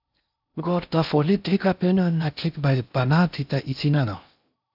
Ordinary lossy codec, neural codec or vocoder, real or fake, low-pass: AAC, 48 kbps; codec, 16 kHz in and 24 kHz out, 0.6 kbps, FocalCodec, streaming, 4096 codes; fake; 5.4 kHz